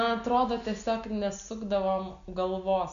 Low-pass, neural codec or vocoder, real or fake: 7.2 kHz; none; real